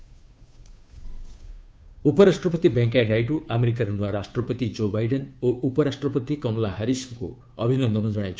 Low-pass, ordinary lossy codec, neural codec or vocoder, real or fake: none; none; codec, 16 kHz, 2 kbps, FunCodec, trained on Chinese and English, 25 frames a second; fake